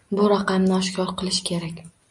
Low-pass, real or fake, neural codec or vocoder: 10.8 kHz; real; none